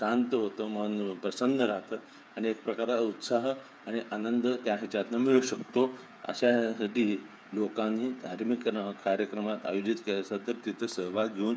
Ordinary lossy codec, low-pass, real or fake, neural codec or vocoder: none; none; fake; codec, 16 kHz, 8 kbps, FreqCodec, smaller model